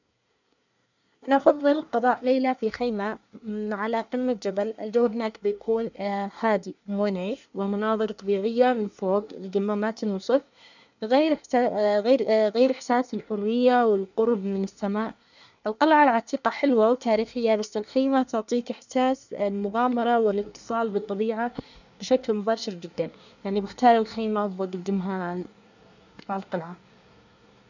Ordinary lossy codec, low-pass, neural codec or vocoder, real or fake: none; 7.2 kHz; codec, 24 kHz, 1 kbps, SNAC; fake